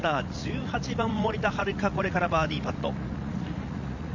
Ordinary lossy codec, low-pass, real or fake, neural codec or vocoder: none; 7.2 kHz; fake; vocoder, 44.1 kHz, 80 mel bands, Vocos